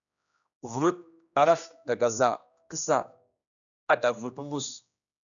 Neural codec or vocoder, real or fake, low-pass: codec, 16 kHz, 1 kbps, X-Codec, HuBERT features, trained on general audio; fake; 7.2 kHz